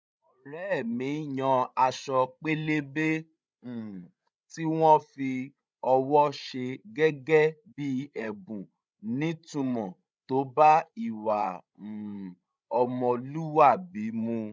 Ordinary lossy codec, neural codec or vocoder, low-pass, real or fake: none; codec, 16 kHz, 16 kbps, FreqCodec, larger model; none; fake